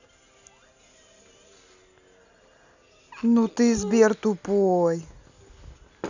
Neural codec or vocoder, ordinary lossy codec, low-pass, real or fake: none; none; 7.2 kHz; real